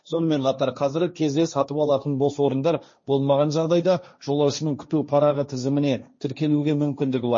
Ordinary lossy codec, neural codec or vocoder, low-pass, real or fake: MP3, 32 kbps; codec, 16 kHz, 1.1 kbps, Voila-Tokenizer; 7.2 kHz; fake